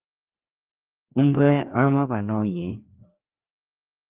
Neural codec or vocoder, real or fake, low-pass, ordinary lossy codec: codec, 16 kHz, 2 kbps, FreqCodec, larger model; fake; 3.6 kHz; Opus, 32 kbps